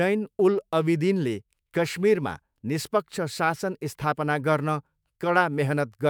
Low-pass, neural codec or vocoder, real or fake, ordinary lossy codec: none; autoencoder, 48 kHz, 128 numbers a frame, DAC-VAE, trained on Japanese speech; fake; none